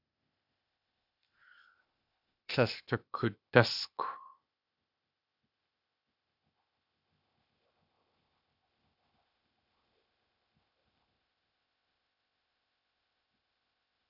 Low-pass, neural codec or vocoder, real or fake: 5.4 kHz; codec, 16 kHz, 0.8 kbps, ZipCodec; fake